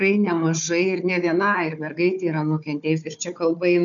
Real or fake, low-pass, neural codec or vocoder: fake; 7.2 kHz; codec, 16 kHz, 4 kbps, FunCodec, trained on Chinese and English, 50 frames a second